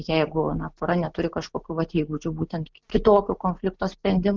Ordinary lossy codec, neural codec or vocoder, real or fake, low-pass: Opus, 24 kbps; none; real; 7.2 kHz